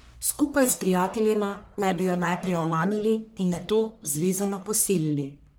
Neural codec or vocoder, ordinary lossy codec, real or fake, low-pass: codec, 44.1 kHz, 1.7 kbps, Pupu-Codec; none; fake; none